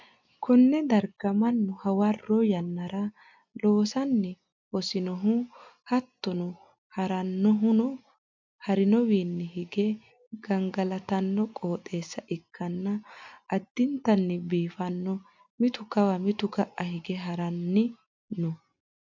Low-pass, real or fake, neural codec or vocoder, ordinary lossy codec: 7.2 kHz; real; none; MP3, 64 kbps